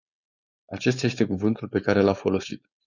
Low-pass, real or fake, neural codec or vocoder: 7.2 kHz; fake; codec, 16 kHz, 4.8 kbps, FACodec